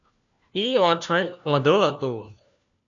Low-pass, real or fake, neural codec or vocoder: 7.2 kHz; fake; codec, 16 kHz, 1 kbps, FunCodec, trained on LibriTTS, 50 frames a second